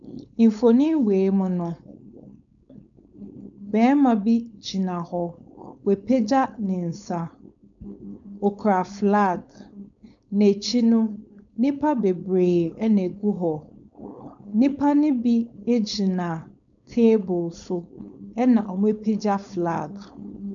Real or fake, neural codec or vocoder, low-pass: fake; codec, 16 kHz, 4.8 kbps, FACodec; 7.2 kHz